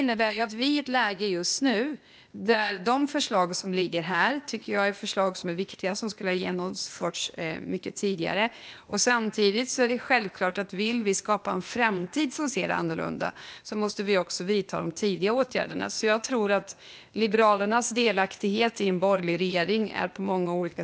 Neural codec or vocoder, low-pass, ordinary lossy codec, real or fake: codec, 16 kHz, 0.8 kbps, ZipCodec; none; none; fake